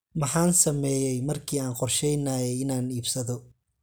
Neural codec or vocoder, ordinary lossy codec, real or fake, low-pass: none; none; real; none